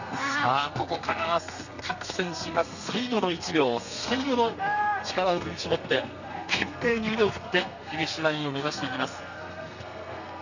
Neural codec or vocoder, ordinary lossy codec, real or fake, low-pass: codec, 32 kHz, 1.9 kbps, SNAC; none; fake; 7.2 kHz